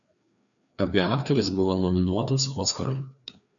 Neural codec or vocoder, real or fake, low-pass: codec, 16 kHz, 2 kbps, FreqCodec, larger model; fake; 7.2 kHz